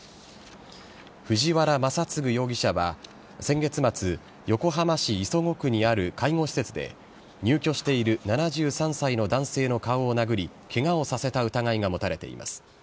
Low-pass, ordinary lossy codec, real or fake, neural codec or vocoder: none; none; real; none